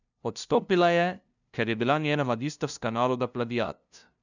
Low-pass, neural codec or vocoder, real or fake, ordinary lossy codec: 7.2 kHz; codec, 16 kHz, 0.5 kbps, FunCodec, trained on LibriTTS, 25 frames a second; fake; none